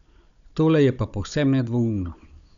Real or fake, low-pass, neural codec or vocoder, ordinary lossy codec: fake; 7.2 kHz; codec, 16 kHz, 16 kbps, FunCodec, trained on Chinese and English, 50 frames a second; none